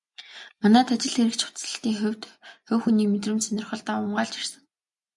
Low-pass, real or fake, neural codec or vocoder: 10.8 kHz; real; none